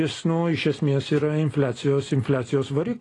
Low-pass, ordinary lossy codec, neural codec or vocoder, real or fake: 10.8 kHz; AAC, 32 kbps; none; real